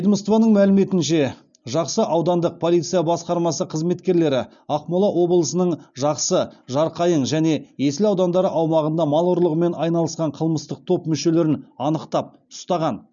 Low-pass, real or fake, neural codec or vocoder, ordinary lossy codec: 7.2 kHz; real; none; none